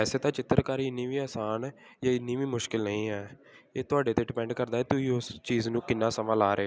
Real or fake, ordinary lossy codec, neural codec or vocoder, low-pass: real; none; none; none